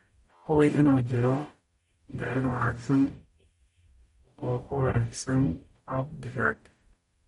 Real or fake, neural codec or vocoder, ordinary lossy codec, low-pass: fake; codec, 44.1 kHz, 0.9 kbps, DAC; MP3, 48 kbps; 19.8 kHz